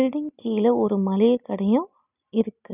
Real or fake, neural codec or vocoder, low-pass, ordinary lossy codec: real; none; 3.6 kHz; none